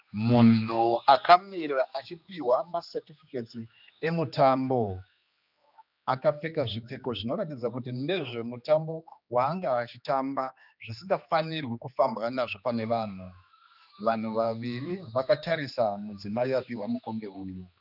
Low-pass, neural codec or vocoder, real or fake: 5.4 kHz; codec, 16 kHz, 2 kbps, X-Codec, HuBERT features, trained on general audio; fake